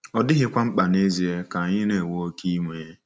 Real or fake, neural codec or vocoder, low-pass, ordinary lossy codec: real; none; none; none